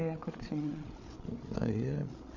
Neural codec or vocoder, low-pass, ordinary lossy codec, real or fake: codec, 16 kHz, 8 kbps, FreqCodec, larger model; 7.2 kHz; none; fake